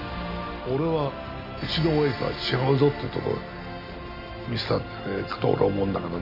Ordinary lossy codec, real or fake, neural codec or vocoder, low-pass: none; real; none; 5.4 kHz